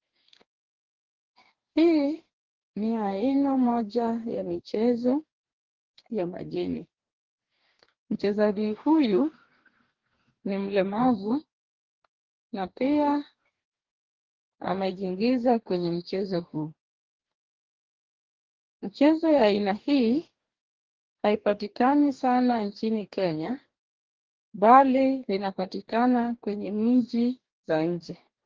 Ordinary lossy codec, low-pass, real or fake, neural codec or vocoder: Opus, 16 kbps; 7.2 kHz; fake; codec, 44.1 kHz, 2.6 kbps, DAC